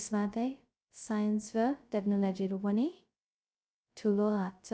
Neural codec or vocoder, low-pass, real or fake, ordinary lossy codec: codec, 16 kHz, 0.2 kbps, FocalCodec; none; fake; none